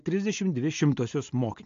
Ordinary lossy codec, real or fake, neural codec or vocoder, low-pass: AAC, 48 kbps; real; none; 7.2 kHz